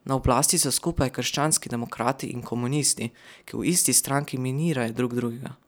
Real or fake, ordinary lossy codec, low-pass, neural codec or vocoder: real; none; none; none